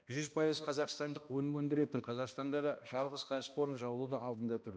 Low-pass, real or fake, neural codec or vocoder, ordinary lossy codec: none; fake; codec, 16 kHz, 1 kbps, X-Codec, HuBERT features, trained on balanced general audio; none